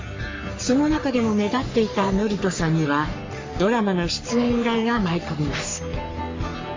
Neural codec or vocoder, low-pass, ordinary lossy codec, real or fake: codec, 44.1 kHz, 3.4 kbps, Pupu-Codec; 7.2 kHz; MP3, 48 kbps; fake